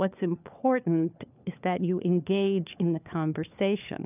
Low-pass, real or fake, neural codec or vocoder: 3.6 kHz; fake; codec, 16 kHz, 4 kbps, FunCodec, trained on LibriTTS, 50 frames a second